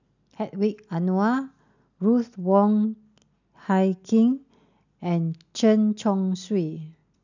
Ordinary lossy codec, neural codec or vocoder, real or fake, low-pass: none; none; real; 7.2 kHz